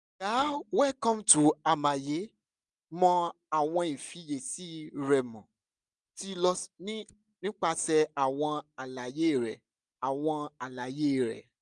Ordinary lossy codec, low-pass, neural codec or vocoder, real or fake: none; 10.8 kHz; none; real